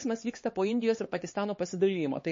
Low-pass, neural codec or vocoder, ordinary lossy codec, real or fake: 7.2 kHz; codec, 16 kHz, 4 kbps, X-Codec, HuBERT features, trained on LibriSpeech; MP3, 32 kbps; fake